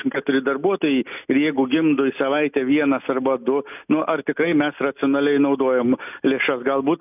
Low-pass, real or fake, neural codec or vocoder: 3.6 kHz; real; none